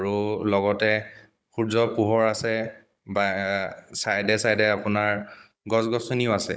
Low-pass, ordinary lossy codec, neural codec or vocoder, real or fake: none; none; codec, 16 kHz, 16 kbps, FunCodec, trained on Chinese and English, 50 frames a second; fake